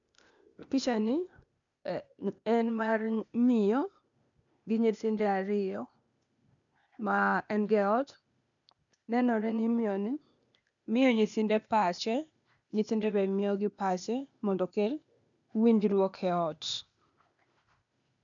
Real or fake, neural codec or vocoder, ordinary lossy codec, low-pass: fake; codec, 16 kHz, 0.8 kbps, ZipCodec; none; 7.2 kHz